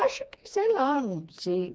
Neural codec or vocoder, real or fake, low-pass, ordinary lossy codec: codec, 16 kHz, 2 kbps, FreqCodec, smaller model; fake; none; none